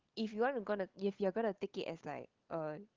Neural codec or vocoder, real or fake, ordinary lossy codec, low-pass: codec, 16 kHz, 16 kbps, FunCodec, trained on LibriTTS, 50 frames a second; fake; Opus, 16 kbps; 7.2 kHz